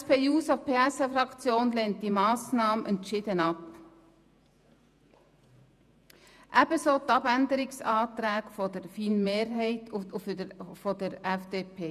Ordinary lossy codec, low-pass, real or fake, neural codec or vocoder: none; 14.4 kHz; fake; vocoder, 48 kHz, 128 mel bands, Vocos